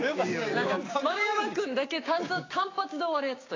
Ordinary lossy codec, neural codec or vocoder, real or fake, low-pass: AAC, 32 kbps; codec, 16 kHz, 6 kbps, DAC; fake; 7.2 kHz